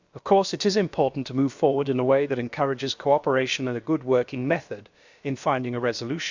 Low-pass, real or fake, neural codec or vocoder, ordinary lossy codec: 7.2 kHz; fake; codec, 16 kHz, about 1 kbps, DyCAST, with the encoder's durations; Opus, 64 kbps